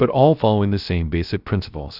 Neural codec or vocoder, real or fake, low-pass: codec, 16 kHz, 0.3 kbps, FocalCodec; fake; 5.4 kHz